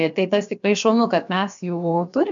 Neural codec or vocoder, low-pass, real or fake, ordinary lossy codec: codec, 16 kHz, about 1 kbps, DyCAST, with the encoder's durations; 7.2 kHz; fake; MP3, 64 kbps